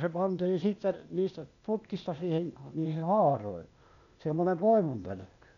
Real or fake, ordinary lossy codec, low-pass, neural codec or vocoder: fake; none; 7.2 kHz; codec, 16 kHz, 0.8 kbps, ZipCodec